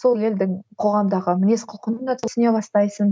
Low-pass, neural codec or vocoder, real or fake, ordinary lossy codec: none; none; real; none